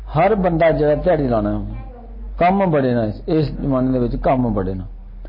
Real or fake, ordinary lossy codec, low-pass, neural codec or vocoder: real; MP3, 24 kbps; 5.4 kHz; none